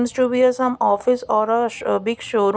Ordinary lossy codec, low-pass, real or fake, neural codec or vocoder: none; none; real; none